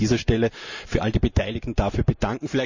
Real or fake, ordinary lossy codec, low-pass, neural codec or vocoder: fake; none; 7.2 kHz; vocoder, 44.1 kHz, 128 mel bands every 512 samples, BigVGAN v2